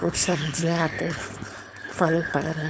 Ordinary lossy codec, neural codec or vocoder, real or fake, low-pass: none; codec, 16 kHz, 4.8 kbps, FACodec; fake; none